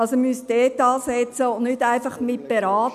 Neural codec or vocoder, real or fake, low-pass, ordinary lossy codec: none; real; 14.4 kHz; none